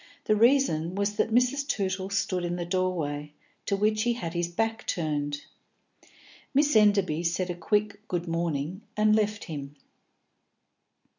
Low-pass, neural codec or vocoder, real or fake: 7.2 kHz; none; real